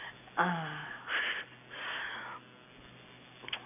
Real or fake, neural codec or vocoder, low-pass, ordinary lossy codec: real; none; 3.6 kHz; none